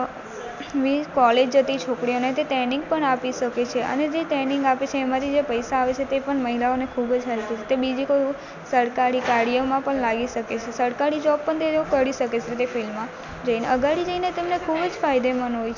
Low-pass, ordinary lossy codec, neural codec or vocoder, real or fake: 7.2 kHz; none; none; real